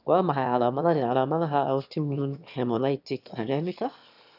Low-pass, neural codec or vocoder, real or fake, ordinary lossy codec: 5.4 kHz; autoencoder, 22.05 kHz, a latent of 192 numbers a frame, VITS, trained on one speaker; fake; none